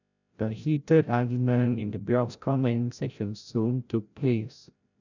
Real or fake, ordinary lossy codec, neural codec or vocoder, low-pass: fake; none; codec, 16 kHz, 0.5 kbps, FreqCodec, larger model; 7.2 kHz